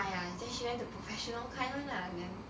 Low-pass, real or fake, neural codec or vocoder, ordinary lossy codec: none; real; none; none